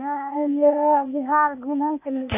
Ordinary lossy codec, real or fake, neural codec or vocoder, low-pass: none; fake; codec, 16 kHz, 0.8 kbps, ZipCodec; 3.6 kHz